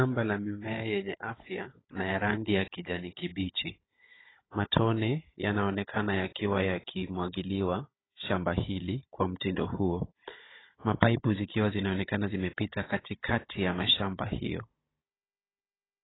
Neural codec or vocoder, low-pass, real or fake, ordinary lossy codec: codec, 16 kHz, 16 kbps, FunCodec, trained on Chinese and English, 50 frames a second; 7.2 kHz; fake; AAC, 16 kbps